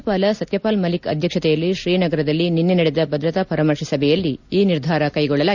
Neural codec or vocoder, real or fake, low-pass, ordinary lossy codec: none; real; 7.2 kHz; none